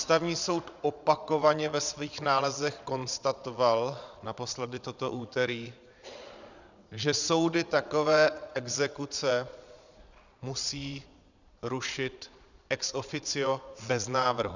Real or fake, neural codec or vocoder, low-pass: fake; vocoder, 22.05 kHz, 80 mel bands, Vocos; 7.2 kHz